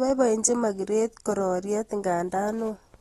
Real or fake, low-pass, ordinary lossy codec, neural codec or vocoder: real; 19.8 kHz; AAC, 32 kbps; none